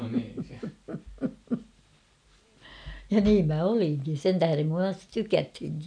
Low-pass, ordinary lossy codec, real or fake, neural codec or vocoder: 9.9 kHz; none; real; none